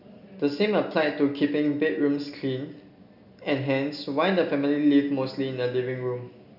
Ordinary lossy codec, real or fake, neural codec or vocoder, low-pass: none; real; none; 5.4 kHz